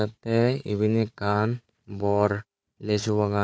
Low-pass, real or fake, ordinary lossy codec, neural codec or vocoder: none; fake; none; codec, 16 kHz, 4 kbps, FunCodec, trained on Chinese and English, 50 frames a second